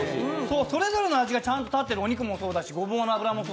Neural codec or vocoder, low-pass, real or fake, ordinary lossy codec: none; none; real; none